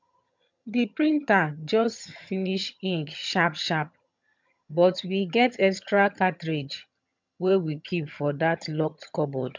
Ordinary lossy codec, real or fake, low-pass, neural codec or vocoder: MP3, 64 kbps; fake; 7.2 kHz; vocoder, 22.05 kHz, 80 mel bands, HiFi-GAN